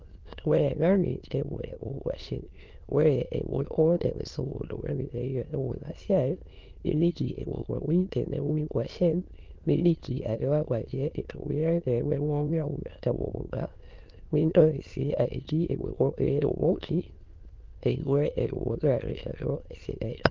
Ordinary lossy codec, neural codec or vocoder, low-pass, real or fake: Opus, 32 kbps; autoencoder, 22.05 kHz, a latent of 192 numbers a frame, VITS, trained on many speakers; 7.2 kHz; fake